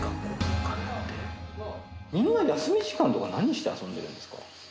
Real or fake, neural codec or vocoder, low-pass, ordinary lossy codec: real; none; none; none